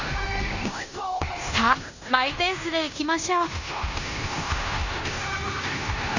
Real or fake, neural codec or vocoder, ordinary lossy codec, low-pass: fake; codec, 16 kHz in and 24 kHz out, 0.9 kbps, LongCat-Audio-Codec, fine tuned four codebook decoder; none; 7.2 kHz